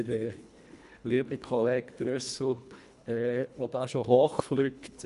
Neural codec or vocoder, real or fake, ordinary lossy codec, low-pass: codec, 24 kHz, 1.5 kbps, HILCodec; fake; none; 10.8 kHz